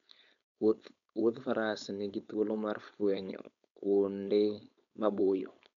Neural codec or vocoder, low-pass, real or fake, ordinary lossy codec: codec, 16 kHz, 4.8 kbps, FACodec; 7.2 kHz; fake; none